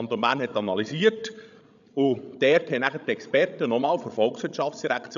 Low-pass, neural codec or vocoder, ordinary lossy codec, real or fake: 7.2 kHz; codec, 16 kHz, 16 kbps, FreqCodec, larger model; none; fake